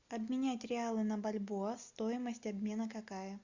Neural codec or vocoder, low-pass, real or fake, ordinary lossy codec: none; 7.2 kHz; real; Opus, 64 kbps